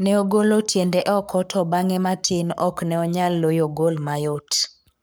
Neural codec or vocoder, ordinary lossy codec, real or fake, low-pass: codec, 44.1 kHz, 7.8 kbps, Pupu-Codec; none; fake; none